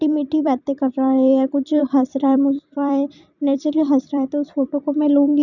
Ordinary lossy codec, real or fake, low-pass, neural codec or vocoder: none; fake; 7.2 kHz; vocoder, 44.1 kHz, 128 mel bands every 256 samples, BigVGAN v2